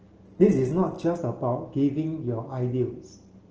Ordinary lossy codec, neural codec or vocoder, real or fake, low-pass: Opus, 16 kbps; none; real; 7.2 kHz